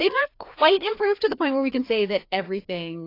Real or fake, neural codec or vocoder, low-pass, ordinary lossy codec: fake; codec, 16 kHz, 2 kbps, FreqCodec, larger model; 5.4 kHz; AAC, 32 kbps